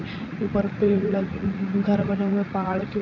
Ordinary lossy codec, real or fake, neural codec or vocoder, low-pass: none; fake; vocoder, 44.1 kHz, 80 mel bands, Vocos; 7.2 kHz